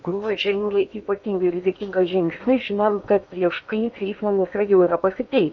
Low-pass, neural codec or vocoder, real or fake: 7.2 kHz; codec, 16 kHz in and 24 kHz out, 0.8 kbps, FocalCodec, streaming, 65536 codes; fake